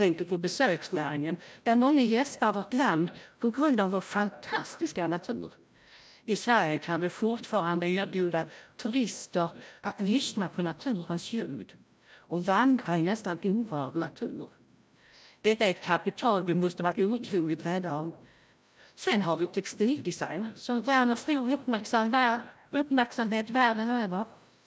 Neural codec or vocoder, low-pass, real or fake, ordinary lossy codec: codec, 16 kHz, 0.5 kbps, FreqCodec, larger model; none; fake; none